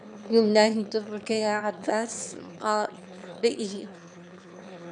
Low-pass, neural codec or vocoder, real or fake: 9.9 kHz; autoencoder, 22.05 kHz, a latent of 192 numbers a frame, VITS, trained on one speaker; fake